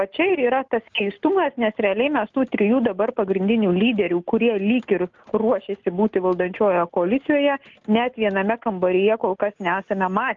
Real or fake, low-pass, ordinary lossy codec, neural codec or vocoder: real; 7.2 kHz; Opus, 32 kbps; none